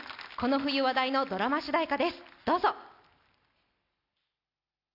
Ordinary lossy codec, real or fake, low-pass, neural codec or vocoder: none; real; 5.4 kHz; none